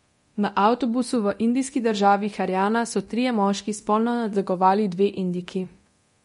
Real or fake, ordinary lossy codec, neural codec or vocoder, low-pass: fake; MP3, 48 kbps; codec, 24 kHz, 0.9 kbps, DualCodec; 10.8 kHz